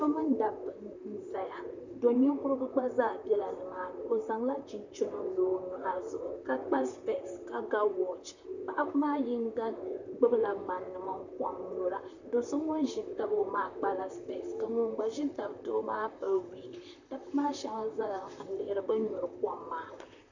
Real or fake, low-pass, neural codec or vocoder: fake; 7.2 kHz; vocoder, 44.1 kHz, 128 mel bands, Pupu-Vocoder